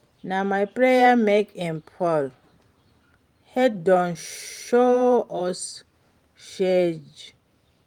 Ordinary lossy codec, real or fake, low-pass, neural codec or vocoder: Opus, 32 kbps; fake; 19.8 kHz; vocoder, 44.1 kHz, 128 mel bands every 512 samples, BigVGAN v2